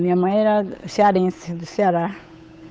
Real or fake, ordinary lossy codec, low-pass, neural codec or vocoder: fake; none; none; codec, 16 kHz, 8 kbps, FunCodec, trained on Chinese and English, 25 frames a second